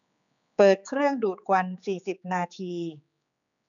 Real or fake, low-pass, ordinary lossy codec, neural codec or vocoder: fake; 7.2 kHz; none; codec, 16 kHz, 4 kbps, X-Codec, HuBERT features, trained on general audio